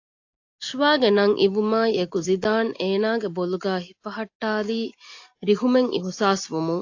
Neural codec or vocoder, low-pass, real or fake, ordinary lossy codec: none; 7.2 kHz; real; AAC, 48 kbps